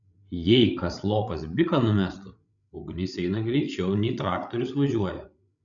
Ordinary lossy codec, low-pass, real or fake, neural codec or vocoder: MP3, 96 kbps; 7.2 kHz; fake; codec, 16 kHz, 8 kbps, FreqCodec, larger model